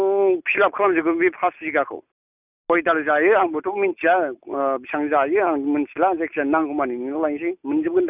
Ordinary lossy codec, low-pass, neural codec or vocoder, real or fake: none; 3.6 kHz; none; real